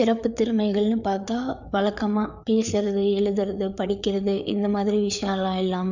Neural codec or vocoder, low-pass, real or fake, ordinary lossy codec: codec, 16 kHz, 4 kbps, FreqCodec, larger model; 7.2 kHz; fake; none